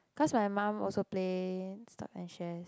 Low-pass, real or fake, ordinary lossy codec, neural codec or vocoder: none; real; none; none